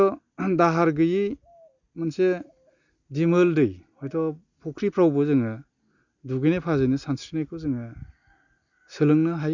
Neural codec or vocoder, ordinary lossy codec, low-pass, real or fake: none; Opus, 64 kbps; 7.2 kHz; real